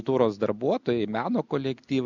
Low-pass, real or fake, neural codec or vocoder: 7.2 kHz; real; none